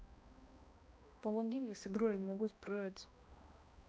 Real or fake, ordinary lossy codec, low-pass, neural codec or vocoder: fake; none; none; codec, 16 kHz, 1 kbps, X-Codec, HuBERT features, trained on balanced general audio